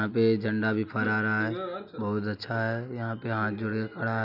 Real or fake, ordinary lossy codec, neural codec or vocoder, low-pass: real; none; none; 5.4 kHz